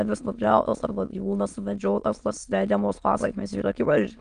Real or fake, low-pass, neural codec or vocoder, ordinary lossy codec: fake; 9.9 kHz; autoencoder, 22.05 kHz, a latent of 192 numbers a frame, VITS, trained on many speakers; Opus, 32 kbps